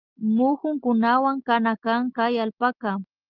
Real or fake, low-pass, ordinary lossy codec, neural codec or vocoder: real; 5.4 kHz; Opus, 32 kbps; none